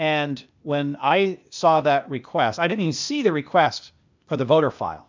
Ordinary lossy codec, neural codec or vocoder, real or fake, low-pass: MP3, 64 kbps; codec, 16 kHz, 0.8 kbps, ZipCodec; fake; 7.2 kHz